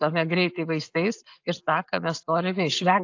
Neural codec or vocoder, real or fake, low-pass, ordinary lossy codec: none; real; 7.2 kHz; AAC, 48 kbps